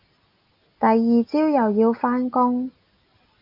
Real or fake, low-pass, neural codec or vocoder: real; 5.4 kHz; none